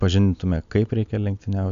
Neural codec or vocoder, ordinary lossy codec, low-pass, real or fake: none; AAC, 96 kbps; 7.2 kHz; real